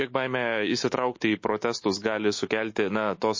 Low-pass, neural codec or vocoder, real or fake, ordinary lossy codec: 7.2 kHz; autoencoder, 48 kHz, 128 numbers a frame, DAC-VAE, trained on Japanese speech; fake; MP3, 32 kbps